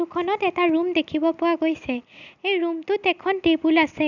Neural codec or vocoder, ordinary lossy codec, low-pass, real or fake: none; none; 7.2 kHz; real